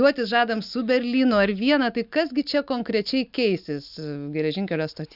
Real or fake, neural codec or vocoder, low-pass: real; none; 5.4 kHz